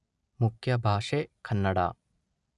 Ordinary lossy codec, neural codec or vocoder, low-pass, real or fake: none; none; 10.8 kHz; real